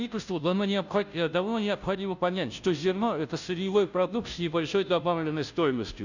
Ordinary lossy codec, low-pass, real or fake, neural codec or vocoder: none; 7.2 kHz; fake; codec, 16 kHz, 0.5 kbps, FunCodec, trained on Chinese and English, 25 frames a second